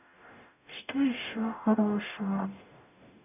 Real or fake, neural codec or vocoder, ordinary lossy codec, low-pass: fake; codec, 44.1 kHz, 0.9 kbps, DAC; none; 3.6 kHz